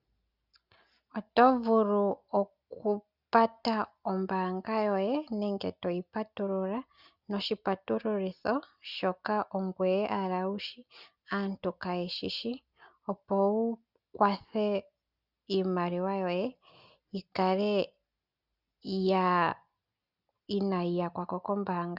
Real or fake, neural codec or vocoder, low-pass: real; none; 5.4 kHz